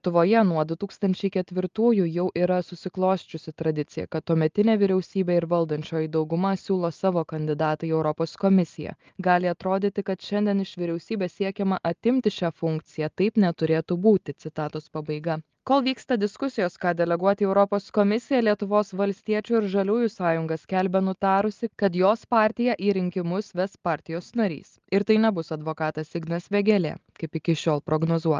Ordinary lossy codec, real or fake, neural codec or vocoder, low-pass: Opus, 32 kbps; real; none; 7.2 kHz